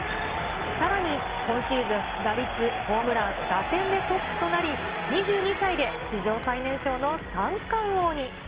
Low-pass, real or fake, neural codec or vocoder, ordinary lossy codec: 3.6 kHz; real; none; Opus, 16 kbps